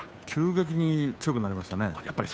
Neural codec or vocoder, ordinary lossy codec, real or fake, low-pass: codec, 16 kHz, 2 kbps, FunCodec, trained on Chinese and English, 25 frames a second; none; fake; none